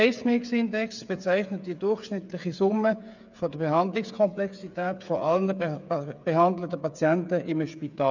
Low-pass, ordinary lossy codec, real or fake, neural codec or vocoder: 7.2 kHz; none; fake; codec, 16 kHz, 8 kbps, FreqCodec, smaller model